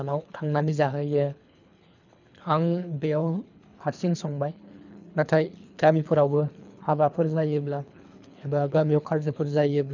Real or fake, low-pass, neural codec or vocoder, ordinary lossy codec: fake; 7.2 kHz; codec, 24 kHz, 3 kbps, HILCodec; none